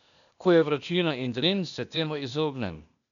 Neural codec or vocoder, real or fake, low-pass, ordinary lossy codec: codec, 16 kHz, 0.8 kbps, ZipCodec; fake; 7.2 kHz; none